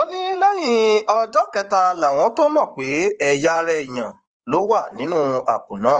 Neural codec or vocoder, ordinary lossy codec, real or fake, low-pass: codec, 16 kHz in and 24 kHz out, 2.2 kbps, FireRedTTS-2 codec; Opus, 24 kbps; fake; 9.9 kHz